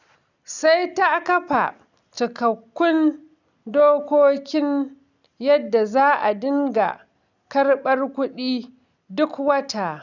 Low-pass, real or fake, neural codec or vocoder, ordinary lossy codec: 7.2 kHz; real; none; none